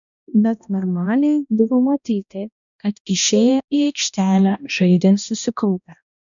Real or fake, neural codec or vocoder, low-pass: fake; codec, 16 kHz, 1 kbps, X-Codec, HuBERT features, trained on balanced general audio; 7.2 kHz